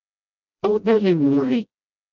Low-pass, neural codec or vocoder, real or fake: 7.2 kHz; codec, 16 kHz, 0.5 kbps, FreqCodec, smaller model; fake